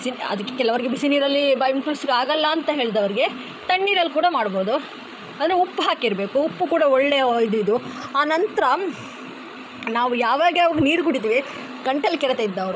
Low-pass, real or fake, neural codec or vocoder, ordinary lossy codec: none; fake; codec, 16 kHz, 16 kbps, FreqCodec, larger model; none